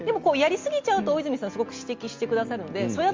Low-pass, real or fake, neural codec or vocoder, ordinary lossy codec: 7.2 kHz; real; none; Opus, 32 kbps